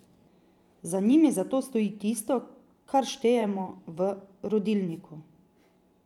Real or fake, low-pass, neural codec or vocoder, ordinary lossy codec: fake; 19.8 kHz; vocoder, 44.1 kHz, 128 mel bands every 512 samples, BigVGAN v2; none